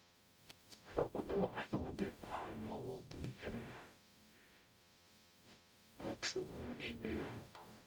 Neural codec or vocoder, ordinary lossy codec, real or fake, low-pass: codec, 44.1 kHz, 0.9 kbps, DAC; none; fake; none